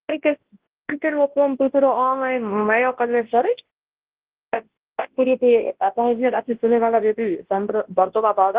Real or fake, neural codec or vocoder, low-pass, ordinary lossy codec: fake; codec, 24 kHz, 0.9 kbps, WavTokenizer, large speech release; 3.6 kHz; Opus, 16 kbps